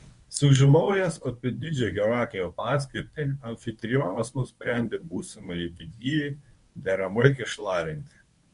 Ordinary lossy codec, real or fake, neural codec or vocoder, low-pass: MP3, 64 kbps; fake; codec, 24 kHz, 0.9 kbps, WavTokenizer, medium speech release version 1; 10.8 kHz